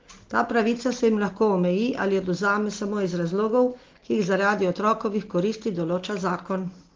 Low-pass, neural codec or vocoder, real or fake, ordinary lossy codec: 7.2 kHz; none; real; Opus, 16 kbps